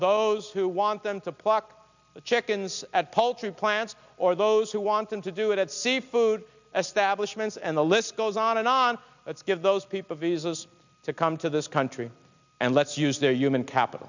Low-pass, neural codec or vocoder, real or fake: 7.2 kHz; none; real